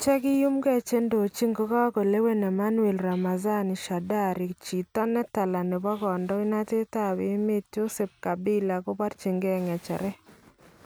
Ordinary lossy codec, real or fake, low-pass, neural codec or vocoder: none; real; none; none